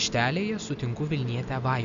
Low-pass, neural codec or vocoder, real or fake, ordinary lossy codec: 7.2 kHz; none; real; AAC, 96 kbps